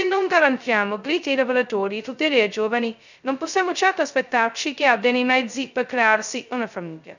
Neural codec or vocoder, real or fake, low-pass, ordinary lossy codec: codec, 16 kHz, 0.2 kbps, FocalCodec; fake; 7.2 kHz; none